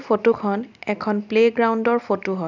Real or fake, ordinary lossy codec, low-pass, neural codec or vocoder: real; none; 7.2 kHz; none